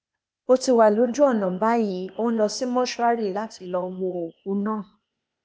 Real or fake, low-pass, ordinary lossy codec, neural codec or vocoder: fake; none; none; codec, 16 kHz, 0.8 kbps, ZipCodec